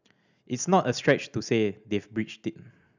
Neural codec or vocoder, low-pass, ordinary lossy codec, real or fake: none; 7.2 kHz; none; real